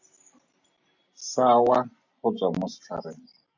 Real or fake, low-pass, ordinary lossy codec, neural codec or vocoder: real; 7.2 kHz; AAC, 48 kbps; none